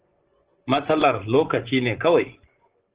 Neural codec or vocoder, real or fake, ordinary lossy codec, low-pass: vocoder, 24 kHz, 100 mel bands, Vocos; fake; Opus, 16 kbps; 3.6 kHz